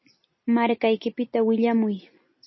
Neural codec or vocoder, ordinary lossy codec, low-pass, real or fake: none; MP3, 24 kbps; 7.2 kHz; real